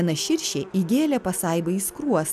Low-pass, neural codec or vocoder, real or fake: 14.4 kHz; none; real